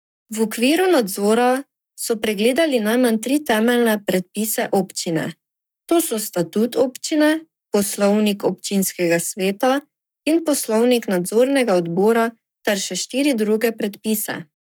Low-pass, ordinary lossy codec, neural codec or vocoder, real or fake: none; none; codec, 44.1 kHz, 7.8 kbps, Pupu-Codec; fake